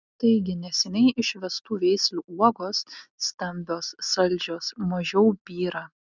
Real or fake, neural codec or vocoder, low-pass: real; none; 7.2 kHz